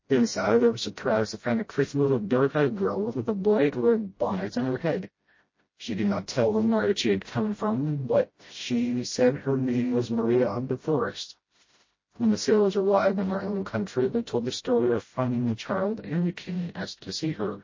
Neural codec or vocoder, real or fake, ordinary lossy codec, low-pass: codec, 16 kHz, 0.5 kbps, FreqCodec, smaller model; fake; MP3, 32 kbps; 7.2 kHz